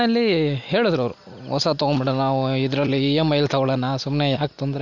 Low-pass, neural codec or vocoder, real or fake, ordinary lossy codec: 7.2 kHz; none; real; none